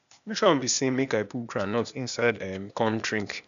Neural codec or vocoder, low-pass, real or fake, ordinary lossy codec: codec, 16 kHz, 0.8 kbps, ZipCodec; 7.2 kHz; fake; none